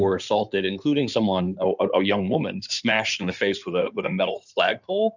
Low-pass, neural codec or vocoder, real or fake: 7.2 kHz; codec, 16 kHz in and 24 kHz out, 2.2 kbps, FireRedTTS-2 codec; fake